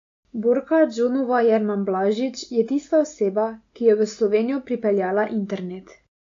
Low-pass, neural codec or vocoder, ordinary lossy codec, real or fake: 7.2 kHz; none; AAC, 48 kbps; real